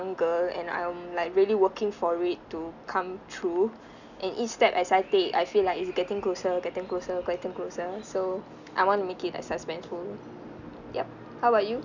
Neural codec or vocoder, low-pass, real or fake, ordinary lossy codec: none; 7.2 kHz; real; none